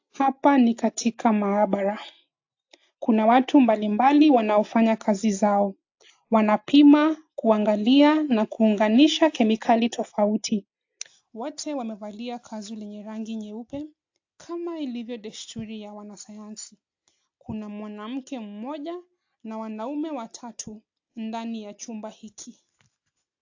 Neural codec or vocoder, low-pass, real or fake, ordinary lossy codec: none; 7.2 kHz; real; AAC, 48 kbps